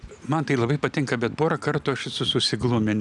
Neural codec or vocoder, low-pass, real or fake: none; 10.8 kHz; real